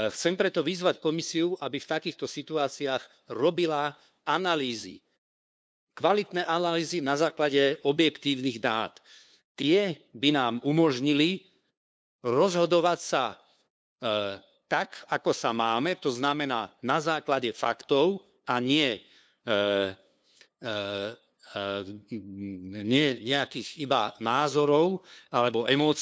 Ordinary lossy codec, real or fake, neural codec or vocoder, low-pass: none; fake; codec, 16 kHz, 2 kbps, FunCodec, trained on LibriTTS, 25 frames a second; none